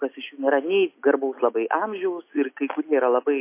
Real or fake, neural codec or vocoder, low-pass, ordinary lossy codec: real; none; 3.6 kHz; MP3, 24 kbps